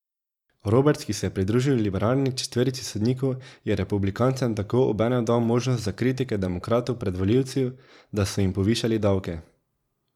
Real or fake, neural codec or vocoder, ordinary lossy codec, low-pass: fake; vocoder, 44.1 kHz, 128 mel bands every 512 samples, BigVGAN v2; none; 19.8 kHz